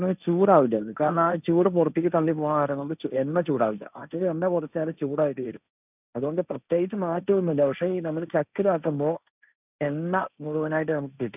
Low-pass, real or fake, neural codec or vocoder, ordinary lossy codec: 3.6 kHz; fake; codec, 16 kHz, 1.1 kbps, Voila-Tokenizer; none